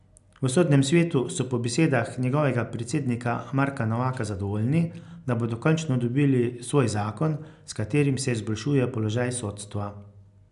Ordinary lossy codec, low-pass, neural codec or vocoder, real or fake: none; 10.8 kHz; none; real